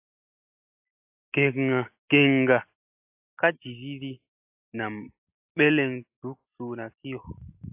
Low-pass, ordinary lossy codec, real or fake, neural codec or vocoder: 3.6 kHz; AAC, 32 kbps; real; none